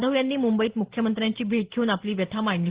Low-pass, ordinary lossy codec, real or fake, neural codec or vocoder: 3.6 kHz; Opus, 16 kbps; real; none